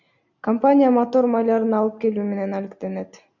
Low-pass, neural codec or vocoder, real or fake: 7.2 kHz; none; real